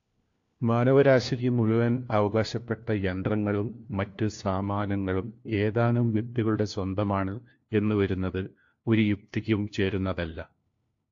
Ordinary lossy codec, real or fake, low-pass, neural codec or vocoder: AAC, 48 kbps; fake; 7.2 kHz; codec, 16 kHz, 1 kbps, FunCodec, trained on LibriTTS, 50 frames a second